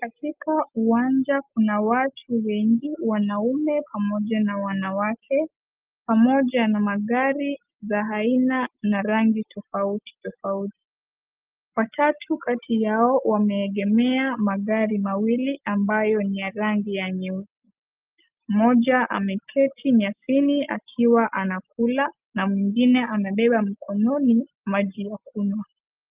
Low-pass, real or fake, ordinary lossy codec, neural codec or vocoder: 3.6 kHz; real; Opus, 24 kbps; none